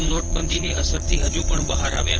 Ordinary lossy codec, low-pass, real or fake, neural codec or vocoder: Opus, 16 kbps; 7.2 kHz; fake; vocoder, 22.05 kHz, 80 mel bands, Vocos